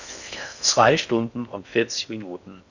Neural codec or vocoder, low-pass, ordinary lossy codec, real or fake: codec, 16 kHz in and 24 kHz out, 0.6 kbps, FocalCodec, streaming, 4096 codes; 7.2 kHz; none; fake